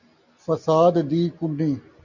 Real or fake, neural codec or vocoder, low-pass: real; none; 7.2 kHz